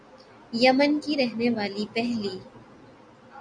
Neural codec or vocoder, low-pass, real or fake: none; 9.9 kHz; real